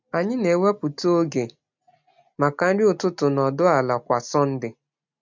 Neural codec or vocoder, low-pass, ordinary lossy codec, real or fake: none; 7.2 kHz; MP3, 64 kbps; real